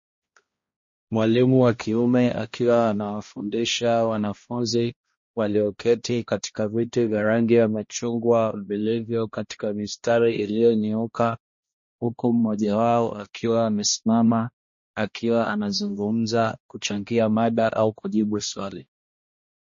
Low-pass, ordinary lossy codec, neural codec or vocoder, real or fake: 7.2 kHz; MP3, 32 kbps; codec, 16 kHz, 1 kbps, X-Codec, HuBERT features, trained on balanced general audio; fake